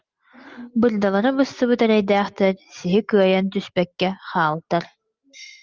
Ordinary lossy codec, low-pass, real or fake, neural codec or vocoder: Opus, 32 kbps; 7.2 kHz; real; none